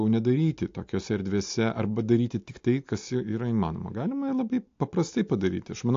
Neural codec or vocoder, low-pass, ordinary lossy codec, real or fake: none; 7.2 kHz; AAC, 48 kbps; real